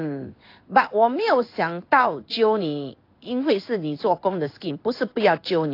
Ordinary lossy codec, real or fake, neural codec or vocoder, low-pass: AAC, 32 kbps; fake; codec, 16 kHz in and 24 kHz out, 1 kbps, XY-Tokenizer; 5.4 kHz